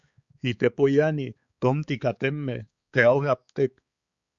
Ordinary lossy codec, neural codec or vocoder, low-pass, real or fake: Opus, 64 kbps; codec, 16 kHz, 4 kbps, X-Codec, HuBERT features, trained on balanced general audio; 7.2 kHz; fake